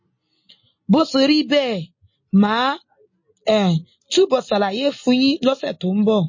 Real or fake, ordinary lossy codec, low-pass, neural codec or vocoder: real; MP3, 32 kbps; 7.2 kHz; none